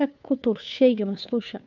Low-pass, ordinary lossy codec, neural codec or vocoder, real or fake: 7.2 kHz; none; codec, 16 kHz, 2 kbps, FunCodec, trained on Chinese and English, 25 frames a second; fake